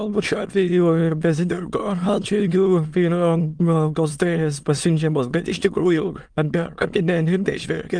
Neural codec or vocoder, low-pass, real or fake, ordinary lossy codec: autoencoder, 22.05 kHz, a latent of 192 numbers a frame, VITS, trained on many speakers; 9.9 kHz; fake; Opus, 32 kbps